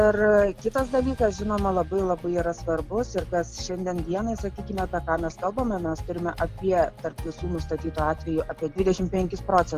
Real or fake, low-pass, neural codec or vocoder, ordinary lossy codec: real; 14.4 kHz; none; Opus, 32 kbps